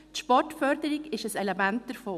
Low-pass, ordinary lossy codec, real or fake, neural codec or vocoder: 14.4 kHz; none; real; none